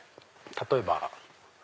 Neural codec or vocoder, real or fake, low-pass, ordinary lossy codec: none; real; none; none